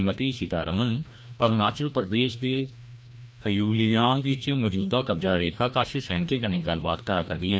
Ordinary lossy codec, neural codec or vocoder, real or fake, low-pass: none; codec, 16 kHz, 1 kbps, FreqCodec, larger model; fake; none